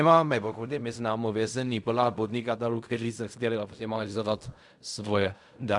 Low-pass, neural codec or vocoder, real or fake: 10.8 kHz; codec, 16 kHz in and 24 kHz out, 0.4 kbps, LongCat-Audio-Codec, fine tuned four codebook decoder; fake